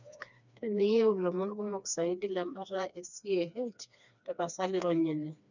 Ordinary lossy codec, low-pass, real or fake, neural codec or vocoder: none; 7.2 kHz; fake; codec, 16 kHz, 2 kbps, FreqCodec, smaller model